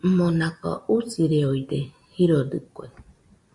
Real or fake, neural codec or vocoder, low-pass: real; none; 10.8 kHz